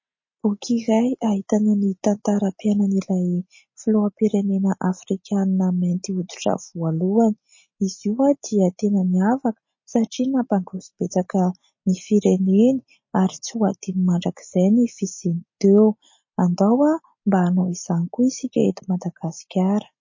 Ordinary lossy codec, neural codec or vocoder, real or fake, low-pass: MP3, 32 kbps; none; real; 7.2 kHz